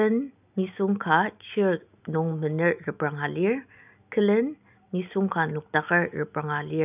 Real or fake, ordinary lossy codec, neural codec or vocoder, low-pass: real; none; none; 3.6 kHz